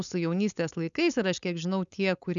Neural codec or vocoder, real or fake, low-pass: codec, 16 kHz, 4.8 kbps, FACodec; fake; 7.2 kHz